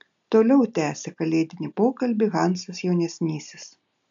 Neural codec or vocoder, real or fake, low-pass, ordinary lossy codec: none; real; 7.2 kHz; AAC, 64 kbps